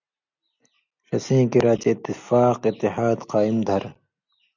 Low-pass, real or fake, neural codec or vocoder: 7.2 kHz; real; none